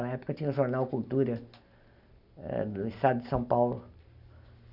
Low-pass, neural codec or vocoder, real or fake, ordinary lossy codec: 5.4 kHz; none; real; none